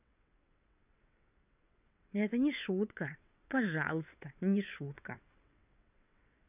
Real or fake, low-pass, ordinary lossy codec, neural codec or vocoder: fake; 3.6 kHz; none; vocoder, 22.05 kHz, 80 mel bands, WaveNeXt